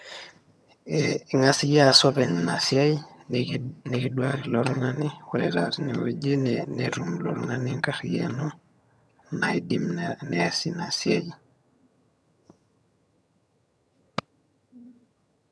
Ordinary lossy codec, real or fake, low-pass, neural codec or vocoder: none; fake; none; vocoder, 22.05 kHz, 80 mel bands, HiFi-GAN